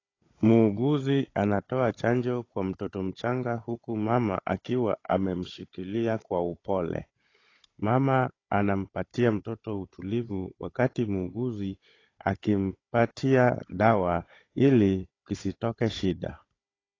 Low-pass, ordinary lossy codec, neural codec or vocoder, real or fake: 7.2 kHz; AAC, 32 kbps; codec, 16 kHz, 16 kbps, FunCodec, trained on Chinese and English, 50 frames a second; fake